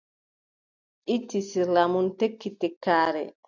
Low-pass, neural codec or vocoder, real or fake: 7.2 kHz; none; real